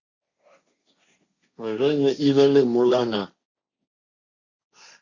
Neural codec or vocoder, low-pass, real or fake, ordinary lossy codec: codec, 16 kHz, 1.1 kbps, Voila-Tokenizer; 7.2 kHz; fake; Opus, 64 kbps